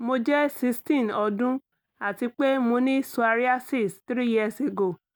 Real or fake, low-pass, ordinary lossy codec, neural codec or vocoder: real; none; none; none